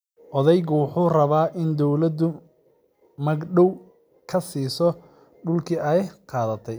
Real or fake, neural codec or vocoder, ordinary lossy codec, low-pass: real; none; none; none